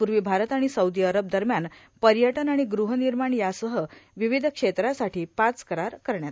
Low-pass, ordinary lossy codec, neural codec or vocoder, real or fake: none; none; none; real